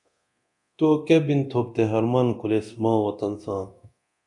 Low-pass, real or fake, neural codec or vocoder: 10.8 kHz; fake; codec, 24 kHz, 0.9 kbps, DualCodec